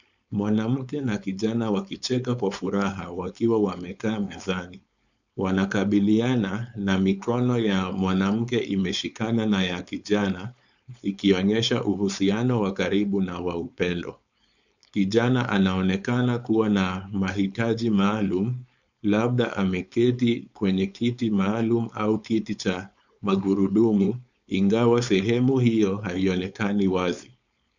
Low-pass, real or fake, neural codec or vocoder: 7.2 kHz; fake; codec, 16 kHz, 4.8 kbps, FACodec